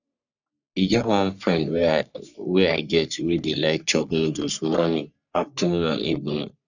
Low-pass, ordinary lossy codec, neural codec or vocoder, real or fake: 7.2 kHz; none; codec, 44.1 kHz, 3.4 kbps, Pupu-Codec; fake